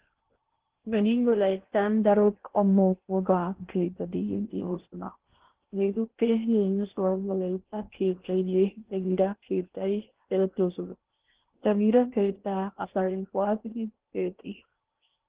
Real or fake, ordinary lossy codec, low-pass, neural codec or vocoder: fake; Opus, 16 kbps; 3.6 kHz; codec, 16 kHz in and 24 kHz out, 0.6 kbps, FocalCodec, streaming, 4096 codes